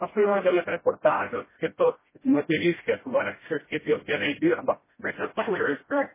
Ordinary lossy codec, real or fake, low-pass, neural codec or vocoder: MP3, 16 kbps; fake; 3.6 kHz; codec, 16 kHz, 0.5 kbps, FreqCodec, smaller model